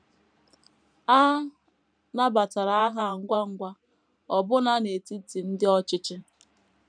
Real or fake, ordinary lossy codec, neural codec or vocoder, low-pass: fake; none; vocoder, 44.1 kHz, 128 mel bands every 512 samples, BigVGAN v2; 9.9 kHz